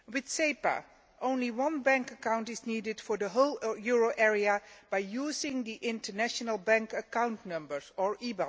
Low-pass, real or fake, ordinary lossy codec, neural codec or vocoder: none; real; none; none